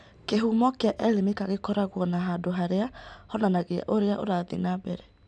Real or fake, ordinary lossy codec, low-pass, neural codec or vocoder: real; none; none; none